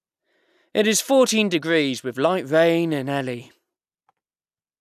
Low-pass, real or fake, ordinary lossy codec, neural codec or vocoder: 14.4 kHz; real; none; none